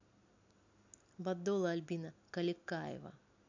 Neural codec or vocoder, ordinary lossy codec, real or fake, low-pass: none; AAC, 48 kbps; real; 7.2 kHz